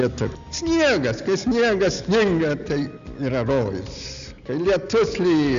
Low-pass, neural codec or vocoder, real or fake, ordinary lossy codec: 7.2 kHz; none; real; Opus, 64 kbps